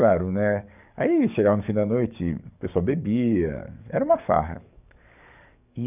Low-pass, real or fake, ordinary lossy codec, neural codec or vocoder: 3.6 kHz; fake; none; codec, 44.1 kHz, 7.8 kbps, DAC